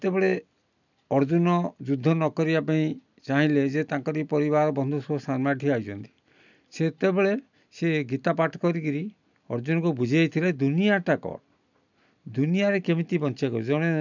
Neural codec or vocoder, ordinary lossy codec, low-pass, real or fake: none; none; 7.2 kHz; real